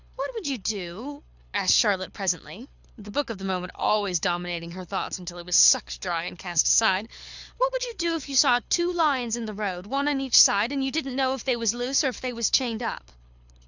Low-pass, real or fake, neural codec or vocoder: 7.2 kHz; fake; codec, 24 kHz, 6 kbps, HILCodec